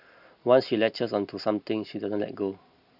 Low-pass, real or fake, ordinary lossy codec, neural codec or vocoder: 5.4 kHz; real; Opus, 64 kbps; none